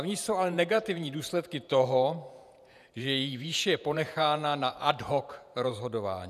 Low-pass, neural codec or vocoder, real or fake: 14.4 kHz; vocoder, 48 kHz, 128 mel bands, Vocos; fake